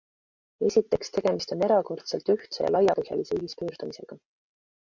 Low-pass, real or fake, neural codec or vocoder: 7.2 kHz; real; none